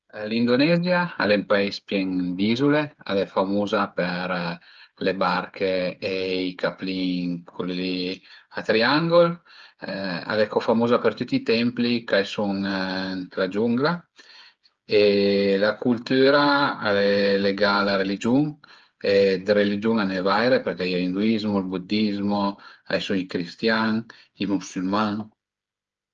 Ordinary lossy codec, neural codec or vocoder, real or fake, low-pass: Opus, 24 kbps; codec, 16 kHz, 8 kbps, FreqCodec, smaller model; fake; 7.2 kHz